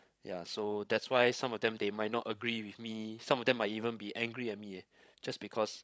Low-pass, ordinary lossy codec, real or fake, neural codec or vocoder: none; none; fake; codec, 16 kHz, 16 kbps, FreqCodec, larger model